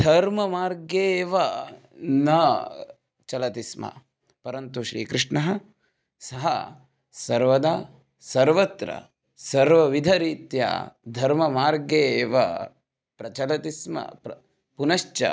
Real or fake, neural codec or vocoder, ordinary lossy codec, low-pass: real; none; none; none